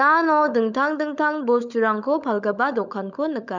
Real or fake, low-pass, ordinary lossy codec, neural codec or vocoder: fake; 7.2 kHz; none; codec, 16 kHz, 16 kbps, FunCodec, trained on LibriTTS, 50 frames a second